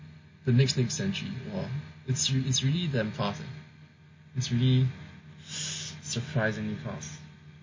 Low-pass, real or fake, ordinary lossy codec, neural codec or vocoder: 7.2 kHz; real; MP3, 32 kbps; none